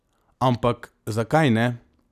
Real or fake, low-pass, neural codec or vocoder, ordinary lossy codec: real; 14.4 kHz; none; none